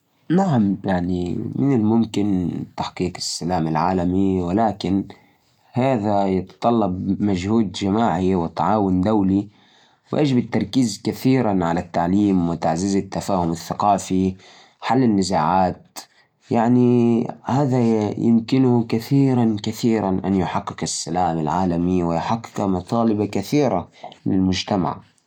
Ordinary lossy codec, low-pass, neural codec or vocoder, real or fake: none; 19.8 kHz; none; real